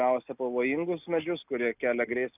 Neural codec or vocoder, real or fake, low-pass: none; real; 3.6 kHz